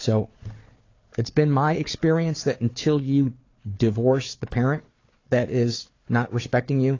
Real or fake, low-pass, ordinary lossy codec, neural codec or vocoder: fake; 7.2 kHz; AAC, 32 kbps; codec, 16 kHz, 4 kbps, FunCodec, trained on Chinese and English, 50 frames a second